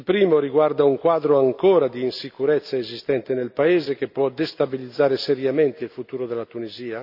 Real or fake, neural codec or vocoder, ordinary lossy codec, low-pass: real; none; none; 5.4 kHz